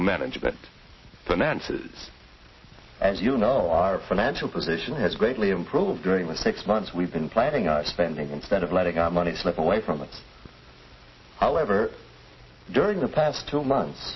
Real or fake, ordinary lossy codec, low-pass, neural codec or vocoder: real; MP3, 24 kbps; 7.2 kHz; none